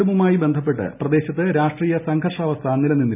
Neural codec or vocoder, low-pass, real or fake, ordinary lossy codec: none; 3.6 kHz; real; none